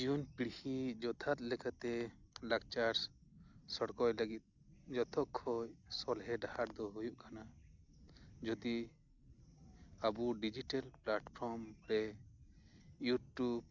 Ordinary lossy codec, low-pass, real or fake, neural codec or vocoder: none; 7.2 kHz; real; none